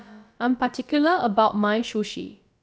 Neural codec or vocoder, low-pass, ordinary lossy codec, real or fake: codec, 16 kHz, about 1 kbps, DyCAST, with the encoder's durations; none; none; fake